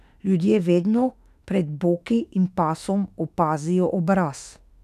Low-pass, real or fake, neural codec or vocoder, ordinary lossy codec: 14.4 kHz; fake; autoencoder, 48 kHz, 32 numbers a frame, DAC-VAE, trained on Japanese speech; none